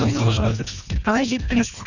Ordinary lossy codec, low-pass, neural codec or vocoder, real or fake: none; 7.2 kHz; codec, 24 kHz, 1.5 kbps, HILCodec; fake